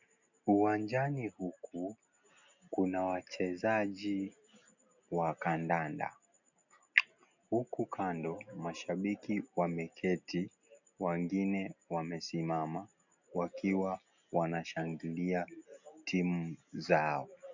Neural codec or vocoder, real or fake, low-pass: none; real; 7.2 kHz